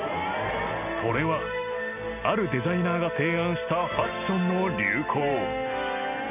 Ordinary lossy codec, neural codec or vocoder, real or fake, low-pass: none; none; real; 3.6 kHz